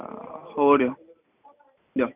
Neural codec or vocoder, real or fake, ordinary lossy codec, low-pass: none; real; none; 3.6 kHz